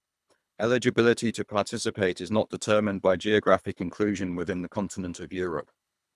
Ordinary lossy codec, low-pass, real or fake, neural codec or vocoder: none; none; fake; codec, 24 kHz, 3 kbps, HILCodec